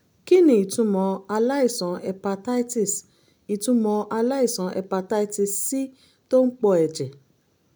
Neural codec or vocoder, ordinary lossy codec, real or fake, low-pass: none; none; real; none